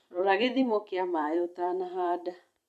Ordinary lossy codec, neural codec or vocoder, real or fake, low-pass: none; vocoder, 44.1 kHz, 128 mel bands every 256 samples, BigVGAN v2; fake; 14.4 kHz